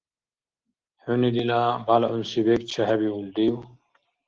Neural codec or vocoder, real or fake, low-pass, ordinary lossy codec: none; real; 7.2 kHz; Opus, 16 kbps